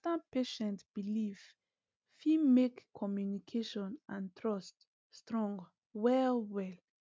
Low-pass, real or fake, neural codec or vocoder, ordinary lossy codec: none; real; none; none